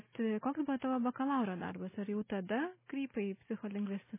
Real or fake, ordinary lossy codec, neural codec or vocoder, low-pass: real; MP3, 16 kbps; none; 3.6 kHz